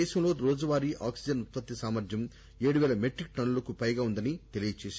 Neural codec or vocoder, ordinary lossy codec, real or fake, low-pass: none; none; real; none